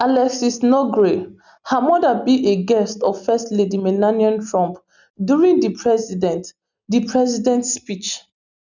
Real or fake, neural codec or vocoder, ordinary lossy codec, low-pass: real; none; none; 7.2 kHz